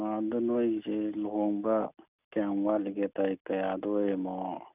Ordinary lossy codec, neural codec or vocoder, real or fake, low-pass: none; none; real; 3.6 kHz